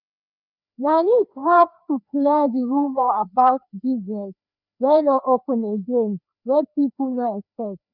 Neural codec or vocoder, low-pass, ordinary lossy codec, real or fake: codec, 16 kHz, 2 kbps, FreqCodec, larger model; 5.4 kHz; none; fake